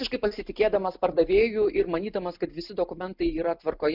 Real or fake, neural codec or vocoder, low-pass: real; none; 5.4 kHz